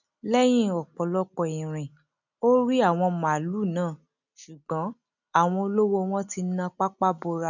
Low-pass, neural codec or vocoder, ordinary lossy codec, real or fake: 7.2 kHz; none; none; real